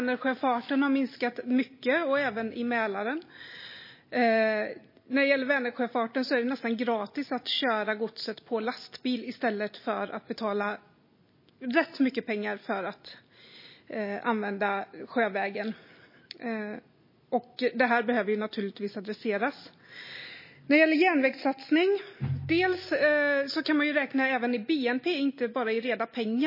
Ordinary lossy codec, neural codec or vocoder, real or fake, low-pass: MP3, 24 kbps; none; real; 5.4 kHz